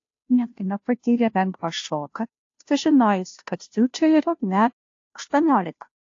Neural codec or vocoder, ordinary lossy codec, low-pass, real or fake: codec, 16 kHz, 0.5 kbps, FunCodec, trained on Chinese and English, 25 frames a second; AAC, 48 kbps; 7.2 kHz; fake